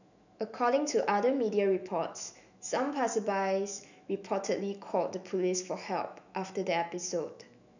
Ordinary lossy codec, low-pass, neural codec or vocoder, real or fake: none; 7.2 kHz; autoencoder, 48 kHz, 128 numbers a frame, DAC-VAE, trained on Japanese speech; fake